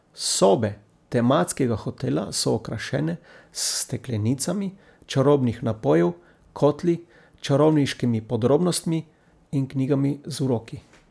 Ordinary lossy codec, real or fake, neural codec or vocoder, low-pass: none; real; none; none